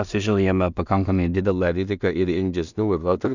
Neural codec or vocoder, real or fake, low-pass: codec, 16 kHz in and 24 kHz out, 0.4 kbps, LongCat-Audio-Codec, two codebook decoder; fake; 7.2 kHz